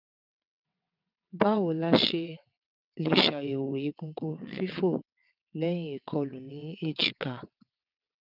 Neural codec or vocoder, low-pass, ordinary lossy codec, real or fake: vocoder, 22.05 kHz, 80 mel bands, WaveNeXt; 5.4 kHz; none; fake